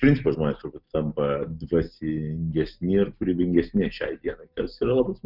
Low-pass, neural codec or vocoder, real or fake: 5.4 kHz; none; real